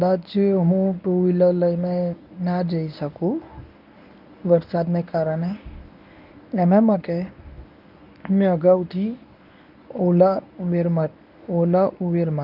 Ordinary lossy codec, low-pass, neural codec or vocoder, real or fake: none; 5.4 kHz; codec, 24 kHz, 0.9 kbps, WavTokenizer, medium speech release version 1; fake